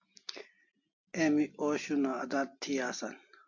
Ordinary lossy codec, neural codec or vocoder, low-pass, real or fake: MP3, 48 kbps; none; 7.2 kHz; real